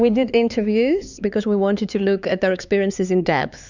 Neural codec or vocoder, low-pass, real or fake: codec, 16 kHz, 4 kbps, X-Codec, HuBERT features, trained on LibriSpeech; 7.2 kHz; fake